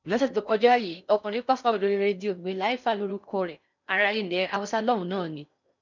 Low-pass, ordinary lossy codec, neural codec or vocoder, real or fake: 7.2 kHz; none; codec, 16 kHz in and 24 kHz out, 0.6 kbps, FocalCodec, streaming, 4096 codes; fake